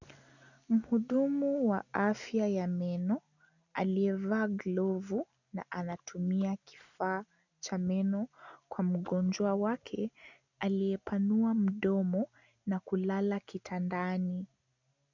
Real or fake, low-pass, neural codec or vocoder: real; 7.2 kHz; none